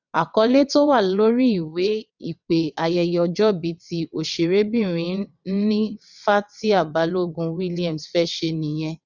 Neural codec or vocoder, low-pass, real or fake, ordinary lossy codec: vocoder, 22.05 kHz, 80 mel bands, WaveNeXt; 7.2 kHz; fake; none